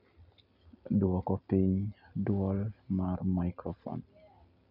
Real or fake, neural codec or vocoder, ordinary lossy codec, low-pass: real; none; none; 5.4 kHz